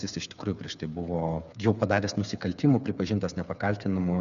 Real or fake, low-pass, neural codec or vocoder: fake; 7.2 kHz; codec, 16 kHz, 8 kbps, FreqCodec, smaller model